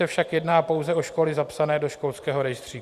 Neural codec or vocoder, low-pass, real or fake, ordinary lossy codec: vocoder, 44.1 kHz, 128 mel bands every 512 samples, BigVGAN v2; 14.4 kHz; fake; Opus, 64 kbps